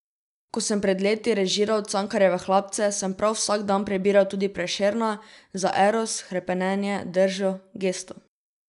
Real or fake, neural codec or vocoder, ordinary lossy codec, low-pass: real; none; none; 10.8 kHz